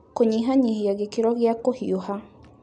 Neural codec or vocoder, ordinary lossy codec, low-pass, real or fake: none; none; 9.9 kHz; real